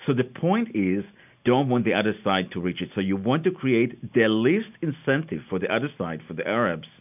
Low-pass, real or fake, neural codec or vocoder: 3.6 kHz; real; none